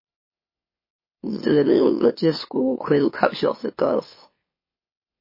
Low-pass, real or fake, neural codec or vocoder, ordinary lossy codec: 5.4 kHz; fake; autoencoder, 44.1 kHz, a latent of 192 numbers a frame, MeloTTS; MP3, 24 kbps